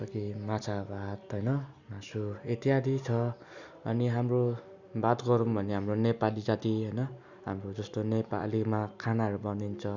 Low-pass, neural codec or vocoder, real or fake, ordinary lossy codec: 7.2 kHz; none; real; none